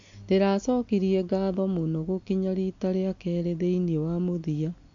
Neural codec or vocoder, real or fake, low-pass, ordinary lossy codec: none; real; 7.2 kHz; AAC, 48 kbps